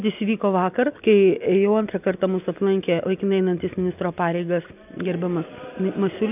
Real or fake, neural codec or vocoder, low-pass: real; none; 3.6 kHz